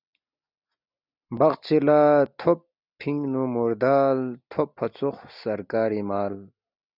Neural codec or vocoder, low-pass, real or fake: none; 5.4 kHz; real